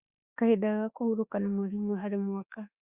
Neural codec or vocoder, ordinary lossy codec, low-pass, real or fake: autoencoder, 48 kHz, 32 numbers a frame, DAC-VAE, trained on Japanese speech; Opus, 64 kbps; 3.6 kHz; fake